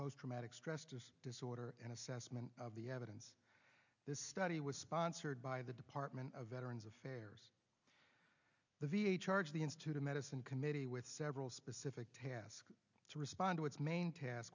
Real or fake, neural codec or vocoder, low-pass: real; none; 7.2 kHz